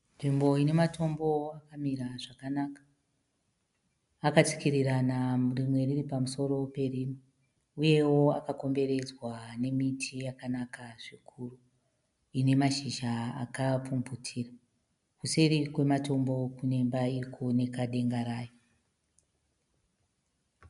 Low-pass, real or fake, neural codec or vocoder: 10.8 kHz; real; none